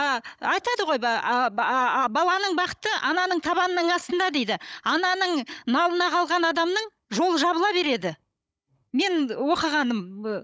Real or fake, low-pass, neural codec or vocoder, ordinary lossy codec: fake; none; codec, 16 kHz, 16 kbps, FunCodec, trained on Chinese and English, 50 frames a second; none